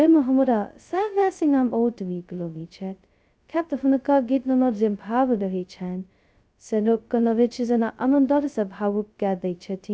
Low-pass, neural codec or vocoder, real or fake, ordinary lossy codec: none; codec, 16 kHz, 0.2 kbps, FocalCodec; fake; none